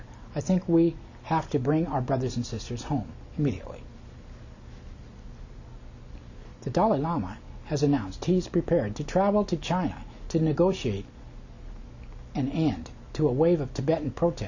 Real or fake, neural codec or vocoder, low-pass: real; none; 7.2 kHz